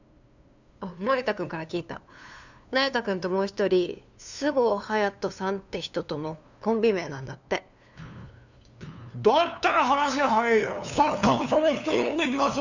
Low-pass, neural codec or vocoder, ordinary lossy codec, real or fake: 7.2 kHz; codec, 16 kHz, 2 kbps, FunCodec, trained on LibriTTS, 25 frames a second; none; fake